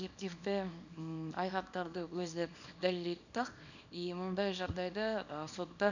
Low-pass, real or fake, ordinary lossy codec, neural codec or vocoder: 7.2 kHz; fake; none; codec, 24 kHz, 0.9 kbps, WavTokenizer, small release